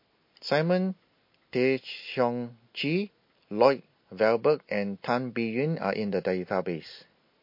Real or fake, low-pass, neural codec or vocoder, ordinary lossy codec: real; 5.4 kHz; none; MP3, 32 kbps